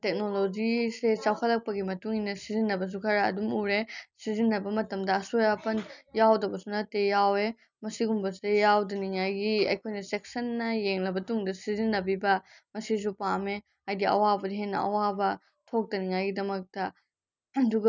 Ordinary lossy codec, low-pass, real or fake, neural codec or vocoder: none; 7.2 kHz; real; none